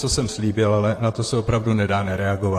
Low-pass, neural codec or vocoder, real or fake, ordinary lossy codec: 14.4 kHz; vocoder, 44.1 kHz, 128 mel bands, Pupu-Vocoder; fake; AAC, 48 kbps